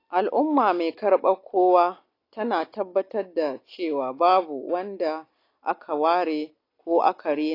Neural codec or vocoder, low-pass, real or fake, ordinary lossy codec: none; 5.4 kHz; real; AAC, 32 kbps